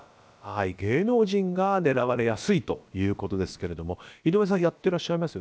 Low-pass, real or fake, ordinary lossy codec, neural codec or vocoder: none; fake; none; codec, 16 kHz, about 1 kbps, DyCAST, with the encoder's durations